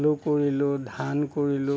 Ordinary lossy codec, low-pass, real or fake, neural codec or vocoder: none; none; real; none